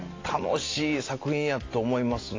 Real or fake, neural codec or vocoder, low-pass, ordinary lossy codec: real; none; 7.2 kHz; AAC, 32 kbps